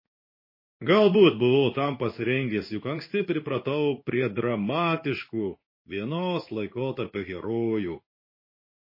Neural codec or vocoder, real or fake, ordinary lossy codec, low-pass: codec, 16 kHz in and 24 kHz out, 1 kbps, XY-Tokenizer; fake; MP3, 24 kbps; 5.4 kHz